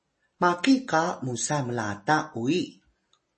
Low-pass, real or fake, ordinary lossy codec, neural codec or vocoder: 10.8 kHz; real; MP3, 32 kbps; none